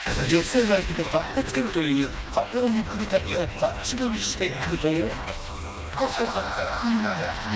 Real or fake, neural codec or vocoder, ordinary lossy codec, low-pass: fake; codec, 16 kHz, 1 kbps, FreqCodec, smaller model; none; none